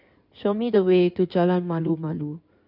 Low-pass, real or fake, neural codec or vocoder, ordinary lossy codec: 5.4 kHz; fake; codec, 16 kHz in and 24 kHz out, 2.2 kbps, FireRedTTS-2 codec; none